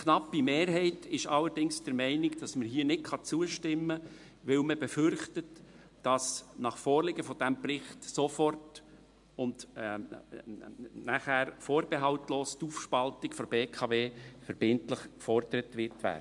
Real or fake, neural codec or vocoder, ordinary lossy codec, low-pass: real; none; MP3, 64 kbps; 10.8 kHz